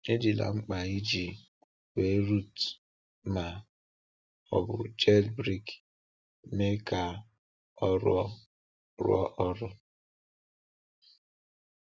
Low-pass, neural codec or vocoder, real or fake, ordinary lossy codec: none; none; real; none